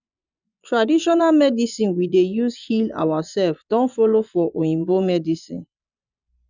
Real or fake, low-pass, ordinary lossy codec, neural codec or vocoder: fake; 7.2 kHz; none; vocoder, 24 kHz, 100 mel bands, Vocos